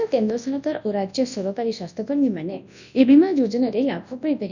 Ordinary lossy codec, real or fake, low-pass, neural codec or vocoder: none; fake; 7.2 kHz; codec, 24 kHz, 0.9 kbps, WavTokenizer, large speech release